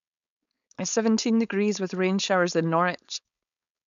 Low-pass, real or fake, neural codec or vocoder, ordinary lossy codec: 7.2 kHz; fake; codec, 16 kHz, 4.8 kbps, FACodec; none